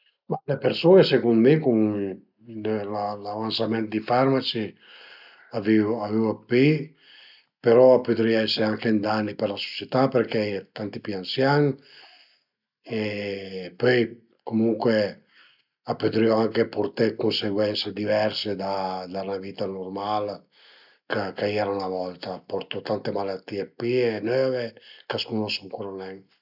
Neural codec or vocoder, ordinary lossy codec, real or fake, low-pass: none; none; real; 5.4 kHz